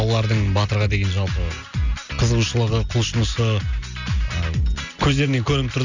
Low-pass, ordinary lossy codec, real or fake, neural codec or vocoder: 7.2 kHz; none; real; none